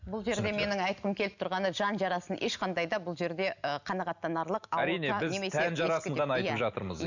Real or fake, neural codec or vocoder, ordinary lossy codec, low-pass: real; none; none; 7.2 kHz